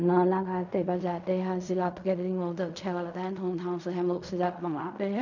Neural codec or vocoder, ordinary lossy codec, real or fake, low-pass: codec, 16 kHz in and 24 kHz out, 0.4 kbps, LongCat-Audio-Codec, fine tuned four codebook decoder; none; fake; 7.2 kHz